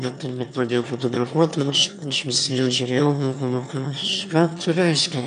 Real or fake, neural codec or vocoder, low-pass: fake; autoencoder, 22.05 kHz, a latent of 192 numbers a frame, VITS, trained on one speaker; 9.9 kHz